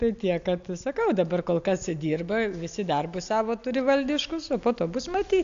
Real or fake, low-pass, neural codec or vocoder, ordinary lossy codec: real; 7.2 kHz; none; AAC, 64 kbps